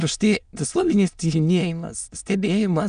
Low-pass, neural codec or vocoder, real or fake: 9.9 kHz; autoencoder, 22.05 kHz, a latent of 192 numbers a frame, VITS, trained on many speakers; fake